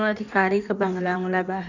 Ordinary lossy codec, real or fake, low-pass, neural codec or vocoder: none; fake; 7.2 kHz; codec, 16 kHz in and 24 kHz out, 1.1 kbps, FireRedTTS-2 codec